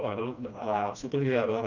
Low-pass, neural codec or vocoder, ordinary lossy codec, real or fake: 7.2 kHz; codec, 16 kHz, 1 kbps, FreqCodec, smaller model; none; fake